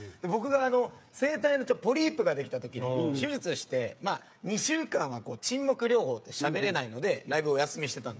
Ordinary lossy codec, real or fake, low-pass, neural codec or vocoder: none; fake; none; codec, 16 kHz, 8 kbps, FreqCodec, smaller model